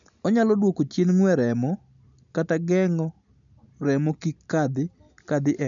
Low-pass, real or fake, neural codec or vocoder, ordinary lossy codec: 7.2 kHz; real; none; none